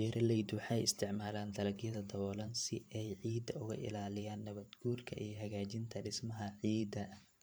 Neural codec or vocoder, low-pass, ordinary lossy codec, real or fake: vocoder, 44.1 kHz, 128 mel bands every 512 samples, BigVGAN v2; none; none; fake